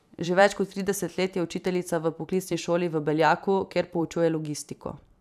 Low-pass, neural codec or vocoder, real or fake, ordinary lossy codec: 14.4 kHz; vocoder, 44.1 kHz, 128 mel bands every 512 samples, BigVGAN v2; fake; none